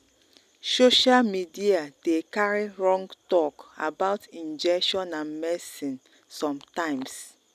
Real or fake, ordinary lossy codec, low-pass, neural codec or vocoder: real; none; 14.4 kHz; none